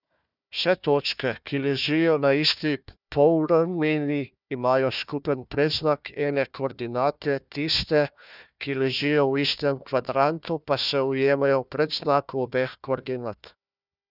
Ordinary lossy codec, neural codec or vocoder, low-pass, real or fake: none; codec, 16 kHz, 1 kbps, FunCodec, trained on Chinese and English, 50 frames a second; 5.4 kHz; fake